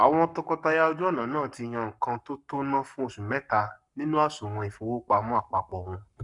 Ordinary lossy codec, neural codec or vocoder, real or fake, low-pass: none; codec, 44.1 kHz, 7.8 kbps, Pupu-Codec; fake; 10.8 kHz